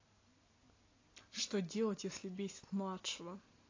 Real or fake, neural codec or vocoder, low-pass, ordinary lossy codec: real; none; 7.2 kHz; AAC, 32 kbps